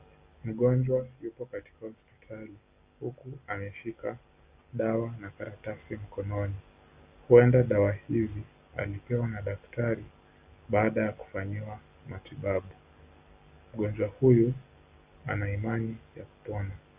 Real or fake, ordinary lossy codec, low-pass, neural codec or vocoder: real; Opus, 64 kbps; 3.6 kHz; none